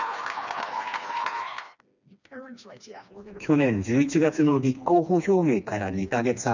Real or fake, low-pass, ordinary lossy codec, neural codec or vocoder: fake; 7.2 kHz; none; codec, 16 kHz, 2 kbps, FreqCodec, smaller model